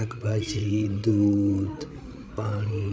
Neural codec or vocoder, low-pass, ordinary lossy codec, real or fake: codec, 16 kHz, 16 kbps, FreqCodec, larger model; none; none; fake